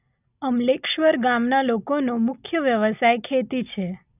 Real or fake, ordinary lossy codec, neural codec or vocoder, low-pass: real; none; none; 3.6 kHz